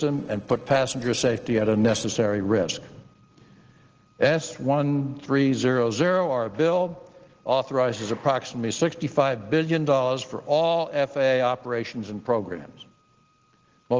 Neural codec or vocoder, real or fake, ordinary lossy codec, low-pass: none; real; Opus, 16 kbps; 7.2 kHz